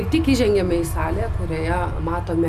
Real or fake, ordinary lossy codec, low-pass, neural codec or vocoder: real; MP3, 96 kbps; 14.4 kHz; none